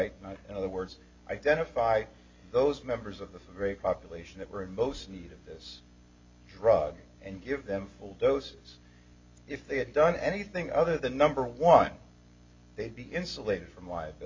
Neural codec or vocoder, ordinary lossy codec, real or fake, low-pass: none; MP3, 64 kbps; real; 7.2 kHz